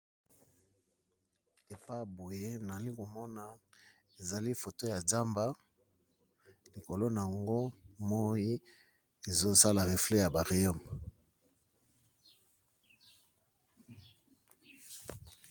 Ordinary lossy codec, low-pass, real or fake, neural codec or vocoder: Opus, 32 kbps; 19.8 kHz; real; none